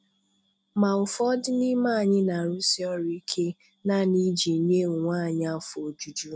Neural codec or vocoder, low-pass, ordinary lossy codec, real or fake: none; none; none; real